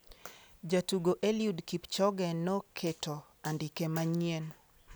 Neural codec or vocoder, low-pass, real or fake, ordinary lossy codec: none; none; real; none